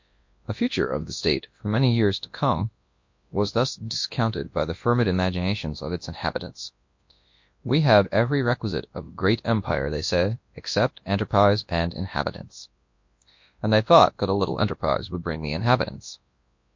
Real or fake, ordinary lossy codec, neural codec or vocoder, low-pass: fake; MP3, 48 kbps; codec, 24 kHz, 0.9 kbps, WavTokenizer, large speech release; 7.2 kHz